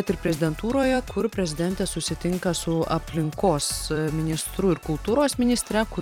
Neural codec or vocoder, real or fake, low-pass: vocoder, 44.1 kHz, 128 mel bands every 256 samples, BigVGAN v2; fake; 19.8 kHz